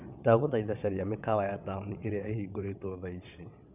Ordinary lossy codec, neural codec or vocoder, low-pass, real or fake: none; vocoder, 22.05 kHz, 80 mel bands, WaveNeXt; 3.6 kHz; fake